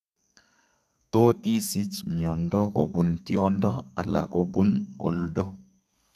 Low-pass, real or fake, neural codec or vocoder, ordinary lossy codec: 14.4 kHz; fake; codec, 32 kHz, 1.9 kbps, SNAC; none